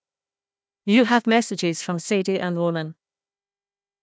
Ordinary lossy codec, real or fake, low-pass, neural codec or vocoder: none; fake; none; codec, 16 kHz, 1 kbps, FunCodec, trained on Chinese and English, 50 frames a second